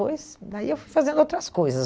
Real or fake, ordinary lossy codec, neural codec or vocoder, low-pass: real; none; none; none